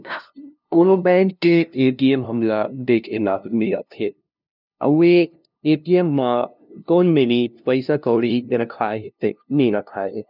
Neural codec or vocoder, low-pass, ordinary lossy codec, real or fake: codec, 16 kHz, 0.5 kbps, FunCodec, trained on LibriTTS, 25 frames a second; 5.4 kHz; none; fake